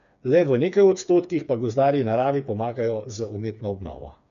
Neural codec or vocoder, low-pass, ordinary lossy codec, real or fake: codec, 16 kHz, 4 kbps, FreqCodec, smaller model; 7.2 kHz; none; fake